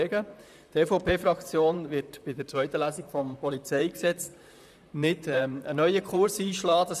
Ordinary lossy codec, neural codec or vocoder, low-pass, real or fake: none; vocoder, 44.1 kHz, 128 mel bands, Pupu-Vocoder; 14.4 kHz; fake